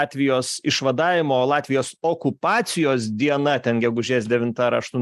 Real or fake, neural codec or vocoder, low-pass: real; none; 14.4 kHz